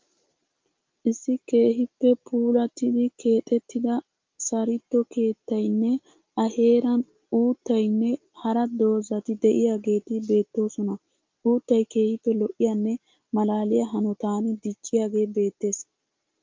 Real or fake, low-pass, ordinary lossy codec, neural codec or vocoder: real; 7.2 kHz; Opus, 24 kbps; none